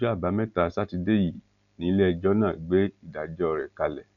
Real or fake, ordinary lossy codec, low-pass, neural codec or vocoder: real; none; 7.2 kHz; none